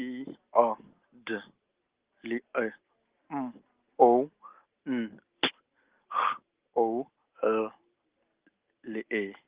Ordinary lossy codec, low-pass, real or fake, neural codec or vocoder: Opus, 16 kbps; 3.6 kHz; real; none